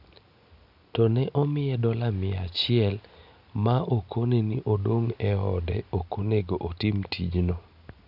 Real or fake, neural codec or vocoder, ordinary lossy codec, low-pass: real; none; none; 5.4 kHz